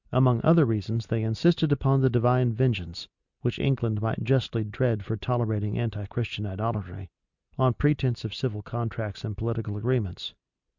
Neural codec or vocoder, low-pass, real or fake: none; 7.2 kHz; real